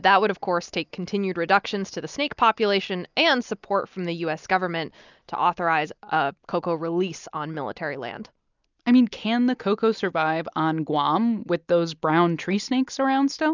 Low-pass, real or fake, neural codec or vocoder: 7.2 kHz; real; none